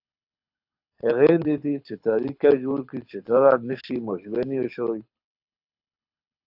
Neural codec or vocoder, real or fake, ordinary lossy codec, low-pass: codec, 24 kHz, 6 kbps, HILCodec; fake; AAC, 48 kbps; 5.4 kHz